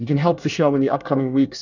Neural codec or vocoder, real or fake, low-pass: codec, 24 kHz, 1 kbps, SNAC; fake; 7.2 kHz